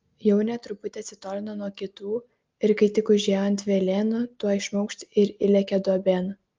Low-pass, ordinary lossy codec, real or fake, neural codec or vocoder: 7.2 kHz; Opus, 32 kbps; real; none